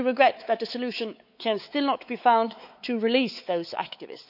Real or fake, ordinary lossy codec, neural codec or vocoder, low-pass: fake; none; codec, 16 kHz, 4 kbps, X-Codec, WavLM features, trained on Multilingual LibriSpeech; 5.4 kHz